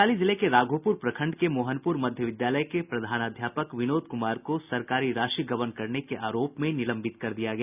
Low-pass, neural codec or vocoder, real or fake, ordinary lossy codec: 3.6 kHz; none; real; none